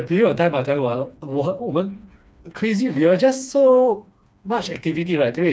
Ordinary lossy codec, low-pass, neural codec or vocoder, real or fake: none; none; codec, 16 kHz, 2 kbps, FreqCodec, smaller model; fake